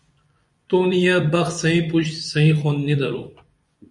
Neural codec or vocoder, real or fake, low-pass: vocoder, 24 kHz, 100 mel bands, Vocos; fake; 10.8 kHz